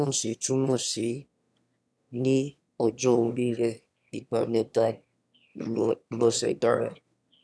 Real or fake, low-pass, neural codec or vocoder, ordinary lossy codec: fake; none; autoencoder, 22.05 kHz, a latent of 192 numbers a frame, VITS, trained on one speaker; none